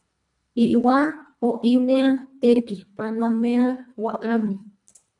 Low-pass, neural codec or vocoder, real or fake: 10.8 kHz; codec, 24 kHz, 1.5 kbps, HILCodec; fake